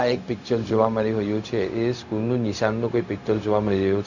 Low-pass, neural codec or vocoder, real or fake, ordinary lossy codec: 7.2 kHz; codec, 16 kHz, 0.4 kbps, LongCat-Audio-Codec; fake; none